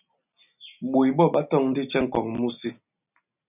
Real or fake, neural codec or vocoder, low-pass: real; none; 3.6 kHz